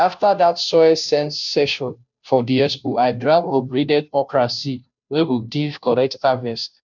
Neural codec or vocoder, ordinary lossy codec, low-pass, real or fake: codec, 16 kHz, 0.5 kbps, FunCodec, trained on Chinese and English, 25 frames a second; none; 7.2 kHz; fake